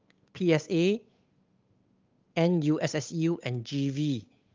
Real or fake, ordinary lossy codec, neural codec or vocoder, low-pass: fake; Opus, 24 kbps; codec, 16 kHz, 8 kbps, FunCodec, trained on Chinese and English, 25 frames a second; 7.2 kHz